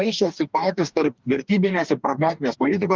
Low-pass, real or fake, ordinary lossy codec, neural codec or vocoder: 7.2 kHz; fake; Opus, 24 kbps; codec, 44.1 kHz, 2.6 kbps, DAC